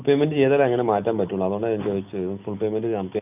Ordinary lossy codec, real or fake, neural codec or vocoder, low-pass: none; real; none; 3.6 kHz